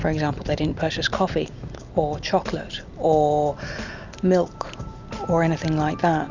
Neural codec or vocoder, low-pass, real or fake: none; 7.2 kHz; real